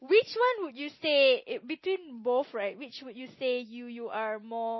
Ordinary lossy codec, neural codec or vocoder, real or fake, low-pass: MP3, 24 kbps; none; real; 7.2 kHz